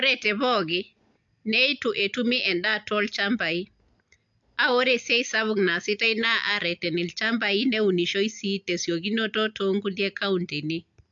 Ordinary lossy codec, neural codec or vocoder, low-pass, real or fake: AAC, 64 kbps; none; 7.2 kHz; real